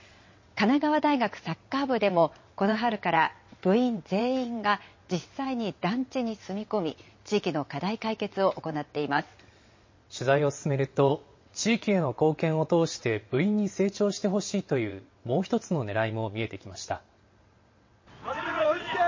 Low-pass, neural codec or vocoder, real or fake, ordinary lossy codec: 7.2 kHz; vocoder, 22.05 kHz, 80 mel bands, WaveNeXt; fake; MP3, 32 kbps